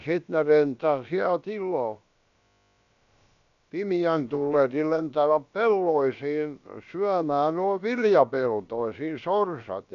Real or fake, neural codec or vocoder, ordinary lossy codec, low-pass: fake; codec, 16 kHz, about 1 kbps, DyCAST, with the encoder's durations; none; 7.2 kHz